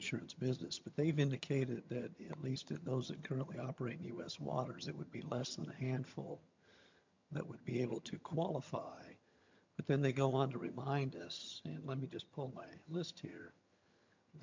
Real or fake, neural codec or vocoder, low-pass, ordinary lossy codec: fake; vocoder, 22.05 kHz, 80 mel bands, HiFi-GAN; 7.2 kHz; MP3, 64 kbps